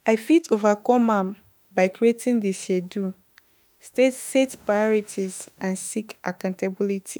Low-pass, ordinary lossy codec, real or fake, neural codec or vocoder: none; none; fake; autoencoder, 48 kHz, 32 numbers a frame, DAC-VAE, trained on Japanese speech